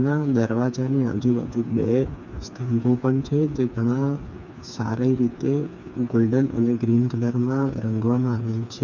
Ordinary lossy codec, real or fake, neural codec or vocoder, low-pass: none; fake; codec, 16 kHz, 4 kbps, FreqCodec, smaller model; 7.2 kHz